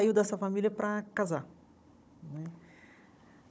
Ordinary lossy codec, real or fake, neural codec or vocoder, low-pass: none; fake; codec, 16 kHz, 16 kbps, FunCodec, trained on Chinese and English, 50 frames a second; none